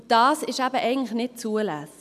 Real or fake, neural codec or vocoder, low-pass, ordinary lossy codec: real; none; 14.4 kHz; MP3, 96 kbps